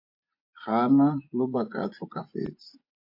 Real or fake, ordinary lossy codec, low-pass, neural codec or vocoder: fake; MP3, 32 kbps; 5.4 kHz; vocoder, 24 kHz, 100 mel bands, Vocos